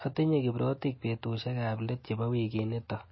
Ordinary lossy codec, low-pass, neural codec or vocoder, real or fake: MP3, 24 kbps; 7.2 kHz; none; real